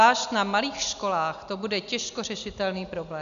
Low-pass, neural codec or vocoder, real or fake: 7.2 kHz; none; real